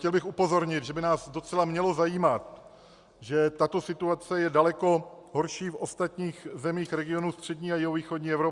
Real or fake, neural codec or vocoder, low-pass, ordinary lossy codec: real; none; 10.8 kHz; Opus, 64 kbps